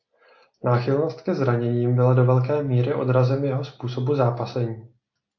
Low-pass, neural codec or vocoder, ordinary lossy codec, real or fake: 7.2 kHz; none; AAC, 48 kbps; real